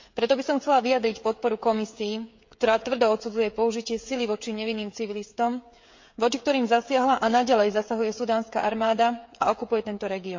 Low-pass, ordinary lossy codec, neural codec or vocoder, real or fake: 7.2 kHz; MP3, 48 kbps; codec, 16 kHz, 16 kbps, FreqCodec, smaller model; fake